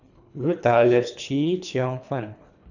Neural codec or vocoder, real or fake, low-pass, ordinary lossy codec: codec, 24 kHz, 3 kbps, HILCodec; fake; 7.2 kHz; none